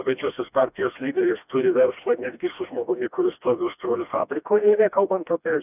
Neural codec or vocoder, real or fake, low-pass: codec, 16 kHz, 1 kbps, FreqCodec, smaller model; fake; 3.6 kHz